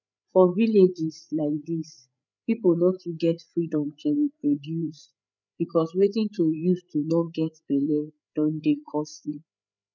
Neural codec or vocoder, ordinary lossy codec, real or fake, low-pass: codec, 16 kHz, 8 kbps, FreqCodec, larger model; none; fake; 7.2 kHz